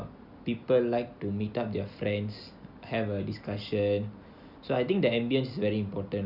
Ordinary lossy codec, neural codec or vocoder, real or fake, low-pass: none; none; real; 5.4 kHz